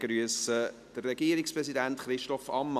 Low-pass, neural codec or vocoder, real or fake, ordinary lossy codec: 14.4 kHz; none; real; none